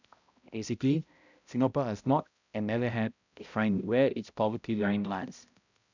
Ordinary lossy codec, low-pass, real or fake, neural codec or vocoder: none; 7.2 kHz; fake; codec, 16 kHz, 0.5 kbps, X-Codec, HuBERT features, trained on balanced general audio